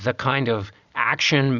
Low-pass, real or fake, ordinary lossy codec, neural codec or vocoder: 7.2 kHz; real; Opus, 64 kbps; none